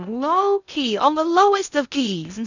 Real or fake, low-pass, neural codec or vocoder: fake; 7.2 kHz; codec, 16 kHz in and 24 kHz out, 0.6 kbps, FocalCodec, streaming, 2048 codes